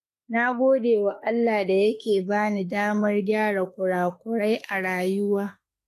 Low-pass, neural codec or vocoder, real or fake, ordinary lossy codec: 14.4 kHz; autoencoder, 48 kHz, 32 numbers a frame, DAC-VAE, trained on Japanese speech; fake; AAC, 48 kbps